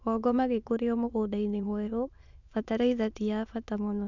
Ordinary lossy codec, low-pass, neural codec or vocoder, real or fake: none; 7.2 kHz; autoencoder, 22.05 kHz, a latent of 192 numbers a frame, VITS, trained on many speakers; fake